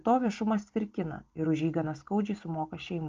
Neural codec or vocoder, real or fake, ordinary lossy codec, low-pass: none; real; Opus, 24 kbps; 7.2 kHz